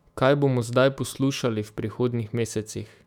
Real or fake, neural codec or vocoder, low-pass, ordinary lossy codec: fake; autoencoder, 48 kHz, 128 numbers a frame, DAC-VAE, trained on Japanese speech; 19.8 kHz; none